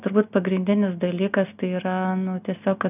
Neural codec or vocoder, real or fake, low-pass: none; real; 3.6 kHz